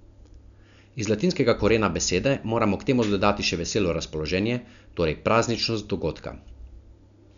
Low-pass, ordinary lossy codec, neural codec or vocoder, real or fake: 7.2 kHz; none; none; real